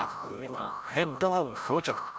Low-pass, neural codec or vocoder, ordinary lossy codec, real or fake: none; codec, 16 kHz, 0.5 kbps, FreqCodec, larger model; none; fake